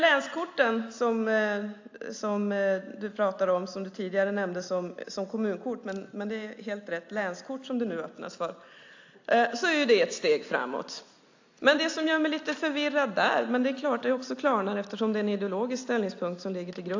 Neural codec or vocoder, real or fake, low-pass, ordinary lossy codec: none; real; 7.2 kHz; AAC, 48 kbps